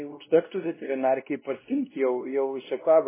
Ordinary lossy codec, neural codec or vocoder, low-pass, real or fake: MP3, 16 kbps; codec, 16 kHz, 1 kbps, X-Codec, WavLM features, trained on Multilingual LibriSpeech; 3.6 kHz; fake